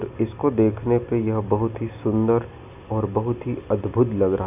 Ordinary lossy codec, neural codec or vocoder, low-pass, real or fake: none; none; 3.6 kHz; real